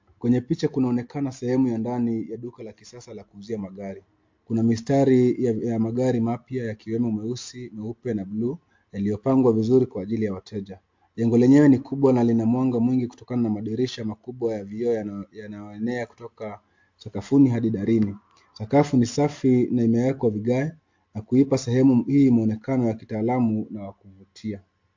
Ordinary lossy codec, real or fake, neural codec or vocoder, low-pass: MP3, 48 kbps; real; none; 7.2 kHz